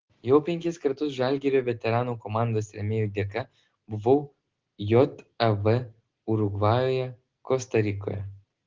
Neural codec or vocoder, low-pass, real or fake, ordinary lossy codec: none; 7.2 kHz; real; Opus, 16 kbps